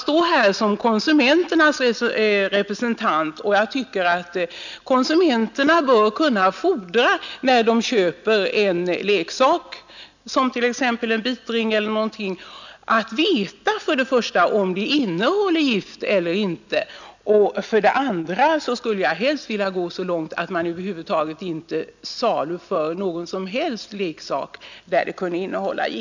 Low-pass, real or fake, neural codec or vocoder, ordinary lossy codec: 7.2 kHz; real; none; none